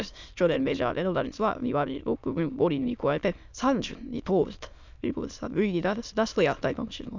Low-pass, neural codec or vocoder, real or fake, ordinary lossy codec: 7.2 kHz; autoencoder, 22.05 kHz, a latent of 192 numbers a frame, VITS, trained on many speakers; fake; none